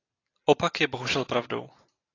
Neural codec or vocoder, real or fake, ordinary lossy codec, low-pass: none; real; AAC, 32 kbps; 7.2 kHz